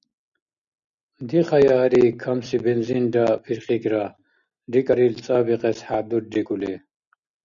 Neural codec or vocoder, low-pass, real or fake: none; 7.2 kHz; real